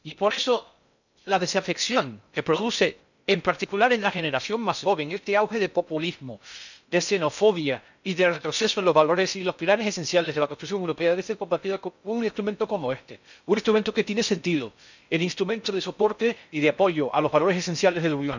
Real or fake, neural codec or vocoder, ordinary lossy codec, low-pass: fake; codec, 16 kHz in and 24 kHz out, 0.6 kbps, FocalCodec, streaming, 2048 codes; none; 7.2 kHz